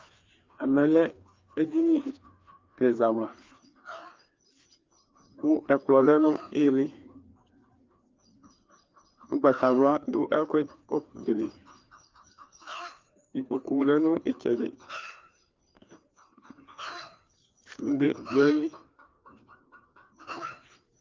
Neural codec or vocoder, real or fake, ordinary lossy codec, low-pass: codec, 16 kHz, 2 kbps, FreqCodec, larger model; fake; Opus, 32 kbps; 7.2 kHz